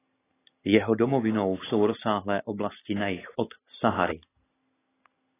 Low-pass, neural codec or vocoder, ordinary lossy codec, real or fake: 3.6 kHz; none; AAC, 16 kbps; real